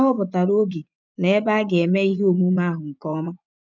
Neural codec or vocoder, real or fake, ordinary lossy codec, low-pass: vocoder, 24 kHz, 100 mel bands, Vocos; fake; none; 7.2 kHz